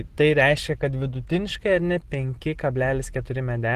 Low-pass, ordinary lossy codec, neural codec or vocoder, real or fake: 14.4 kHz; Opus, 16 kbps; none; real